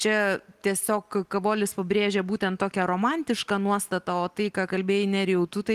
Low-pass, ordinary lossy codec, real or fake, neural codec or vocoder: 14.4 kHz; Opus, 24 kbps; real; none